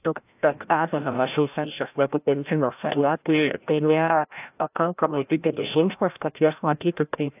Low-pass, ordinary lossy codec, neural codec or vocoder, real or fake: 3.6 kHz; AAC, 32 kbps; codec, 16 kHz, 0.5 kbps, FreqCodec, larger model; fake